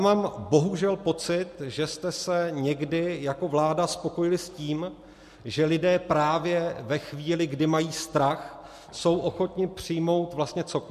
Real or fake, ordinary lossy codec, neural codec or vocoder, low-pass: real; MP3, 64 kbps; none; 14.4 kHz